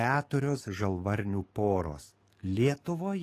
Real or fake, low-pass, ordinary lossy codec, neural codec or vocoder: fake; 14.4 kHz; AAC, 48 kbps; codec, 44.1 kHz, 7.8 kbps, DAC